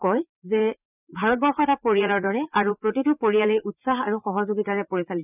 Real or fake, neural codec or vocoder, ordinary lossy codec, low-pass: fake; vocoder, 44.1 kHz, 80 mel bands, Vocos; none; 3.6 kHz